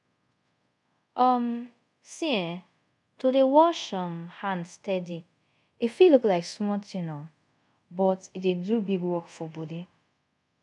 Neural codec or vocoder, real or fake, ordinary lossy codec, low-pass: codec, 24 kHz, 0.5 kbps, DualCodec; fake; none; 10.8 kHz